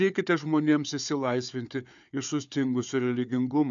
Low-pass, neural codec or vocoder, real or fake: 7.2 kHz; codec, 16 kHz, 4 kbps, FunCodec, trained on Chinese and English, 50 frames a second; fake